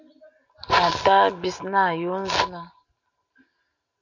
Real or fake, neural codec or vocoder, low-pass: real; none; 7.2 kHz